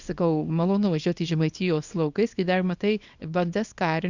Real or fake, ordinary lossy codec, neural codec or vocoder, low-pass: fake; Opus, 64 kbps; codec, 24 kHz, 0.9 kbps, WavTokenizer, small release; 7.2 kHz